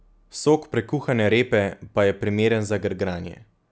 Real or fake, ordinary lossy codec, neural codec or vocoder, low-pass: real; none; none; none